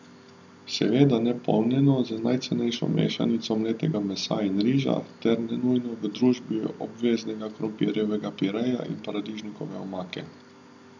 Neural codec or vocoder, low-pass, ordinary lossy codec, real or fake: none; 7.2 kHz; none; real